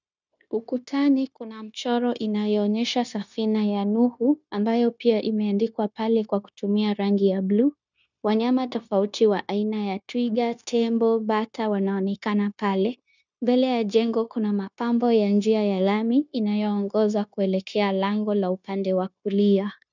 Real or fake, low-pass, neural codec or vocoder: fake; 7.2 kHz; codec, 16 kHz, 0.9 kbps, LongCat-Audio-Codec